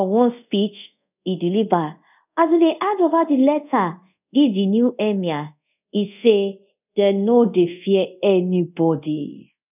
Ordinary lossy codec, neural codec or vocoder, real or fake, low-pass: none; codec, 24 kHz, 0.5 kbps, DualCodec; fake; 3.6 kHz